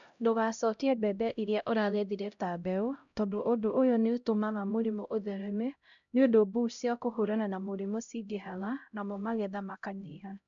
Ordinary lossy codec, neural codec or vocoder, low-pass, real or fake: none; codec, 16 kHz, 0.5 kbps, X-Codec, HuBERT features, trained on LibriSpeech; 7.2 kHz; fake